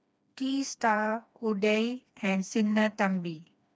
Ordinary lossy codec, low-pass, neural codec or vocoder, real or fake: none; none; codec, 16 kHz, 2 kbps, FreqCodec, smaller model; fake